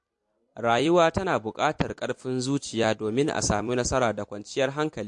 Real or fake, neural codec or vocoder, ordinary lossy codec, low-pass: fake; vocoder, 44.1 kHz, 128 mel bands every 256 samples, BigVGAN v2; MP3, 48 kbps; 10.8 kHz